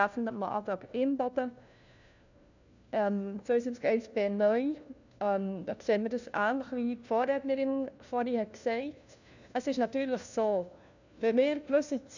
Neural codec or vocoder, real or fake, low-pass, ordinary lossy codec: codec, 16 kHz, 1 kbps, FunCodec, trained on LibriTTS, 50 frames a second; fake; 7.2 kHz; none